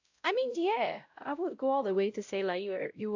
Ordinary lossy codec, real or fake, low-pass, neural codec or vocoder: AAC, 48 kbps; fake; 7.2 kHz; codec, 16 kHz, 0.5 kbps, X-Codec, WavLM features, trained on Multilingual LibriSpeech